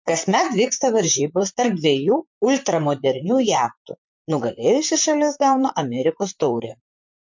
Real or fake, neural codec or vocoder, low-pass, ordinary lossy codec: fake; vocoder, 44.1 kHz, 128 mel bands, Pupu-Vocoder; 7.2 kHz; MP3, 48 kbps